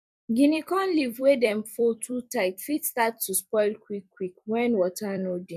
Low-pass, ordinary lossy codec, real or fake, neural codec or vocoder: 14.4 kHz; none; fake; vocoder, 44.1 kHz, 128 mel bands every 256 samples, BigVGAN v2